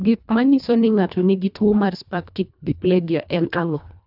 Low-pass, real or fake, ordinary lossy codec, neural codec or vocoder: 5.4 kHz; fake; none; codec, 24 kHz, 1.5 kbps, HILCodec